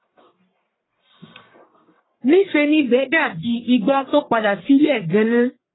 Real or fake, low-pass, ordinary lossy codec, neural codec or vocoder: fake; 7.2 kHz; AAC, 16 kbps; codec, 44.1 kHz, 1.7 kbps, Pupu-Codec